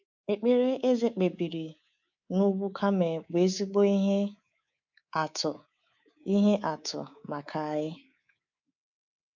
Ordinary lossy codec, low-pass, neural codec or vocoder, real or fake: none; 7.2 kHz; codec, 24 kHz, 3.1 kbps, DualCodec; fake